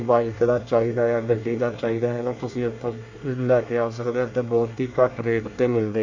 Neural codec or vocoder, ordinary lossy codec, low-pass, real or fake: codec, 24 kHz, 1 kbps, SNAC; AAC, 48 kbps; 7.2 kHz; fake